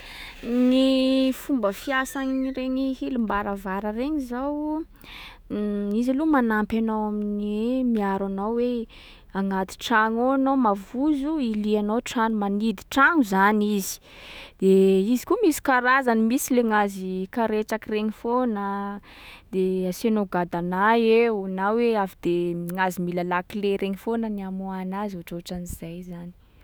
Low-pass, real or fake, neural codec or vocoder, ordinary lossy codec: none; fake; autoencoder, 48 kHz, 128 numbers a frame, DAC-VAE, trained on Japanese speech; none